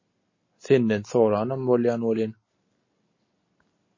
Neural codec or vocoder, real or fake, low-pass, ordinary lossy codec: none; real; 7.2 kHz; MP3, 32 kbps